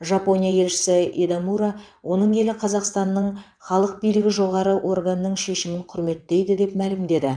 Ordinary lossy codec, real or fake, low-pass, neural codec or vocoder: none; fake; 9.9 kHz; vocoder, 22.05 kHz, 80 mel bands, WaveNeXt